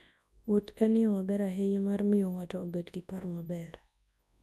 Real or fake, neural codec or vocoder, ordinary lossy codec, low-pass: fake; codec, 24 kHz, 0.9 kbps, WavTokenizer, large speech release; none; none